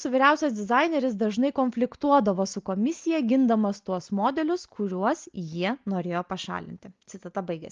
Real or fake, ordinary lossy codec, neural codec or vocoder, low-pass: real; Opus, 32 kbps; none; 7.2 kHz